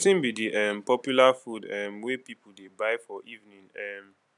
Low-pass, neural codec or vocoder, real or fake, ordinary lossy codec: 10.8 kHz; vocoder, 44.1 kHz, 128 mel bands every 256 samples, BigVGAN v2; fake; none